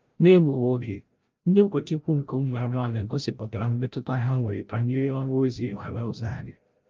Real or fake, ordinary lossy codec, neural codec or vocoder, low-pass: fake; Opus, 32 kbps; codec, 16 kHz, 0.5 kbps, FreqCodec, larger model; 7.2 kHz